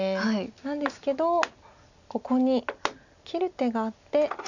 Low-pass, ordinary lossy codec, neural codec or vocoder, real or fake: 7.2 kHz; none; none; real